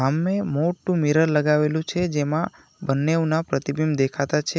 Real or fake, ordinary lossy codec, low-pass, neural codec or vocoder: real; none; none; none